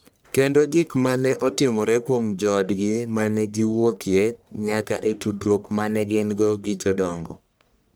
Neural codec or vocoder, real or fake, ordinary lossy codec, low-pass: codec, 44.1 kHz, 1.7 kbps, Pupu-Codec; fake; none; none